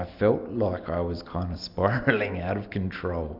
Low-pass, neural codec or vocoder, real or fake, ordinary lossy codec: 5.4 kHz; none; real; MP3, 48 kbps